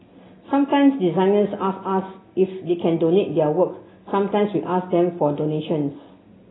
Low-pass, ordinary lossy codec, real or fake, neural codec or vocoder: 7.2 kHz; AAC, 16 kbps; real; none